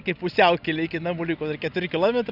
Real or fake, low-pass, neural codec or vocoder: real; 5.4 kHz; none